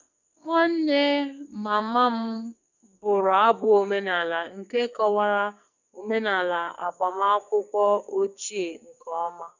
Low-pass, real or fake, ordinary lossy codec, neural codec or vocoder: 7.2 kHz; fake; none; codec, 44.1 kHz, 2.6 kbps, SNAC